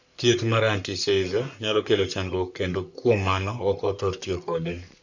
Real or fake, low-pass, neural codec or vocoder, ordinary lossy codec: fake; 7.2 kHz; codec, 44.1 kHz, 3.4 kbps, Pupu-Codec; none